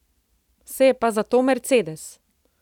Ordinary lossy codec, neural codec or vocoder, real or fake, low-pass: none; none; real; 19.8 kHz